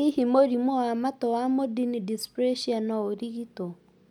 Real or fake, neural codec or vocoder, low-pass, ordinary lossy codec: real; none; 19.8 kHz; none